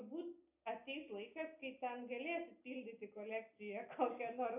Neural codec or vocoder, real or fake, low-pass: none; real; 3.6 kHz